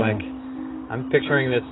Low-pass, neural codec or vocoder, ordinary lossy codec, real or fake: 7.2 kHz; none; AAC, 16 kbps; real